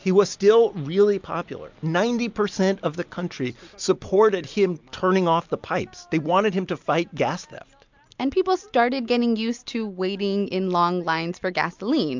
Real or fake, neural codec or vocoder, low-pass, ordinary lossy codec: real; none; 7.2 kHz; MP3, 64 kbps